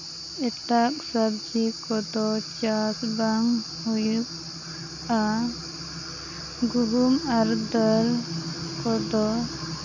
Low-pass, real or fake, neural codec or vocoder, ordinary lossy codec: 7.2 kHz; real; none; none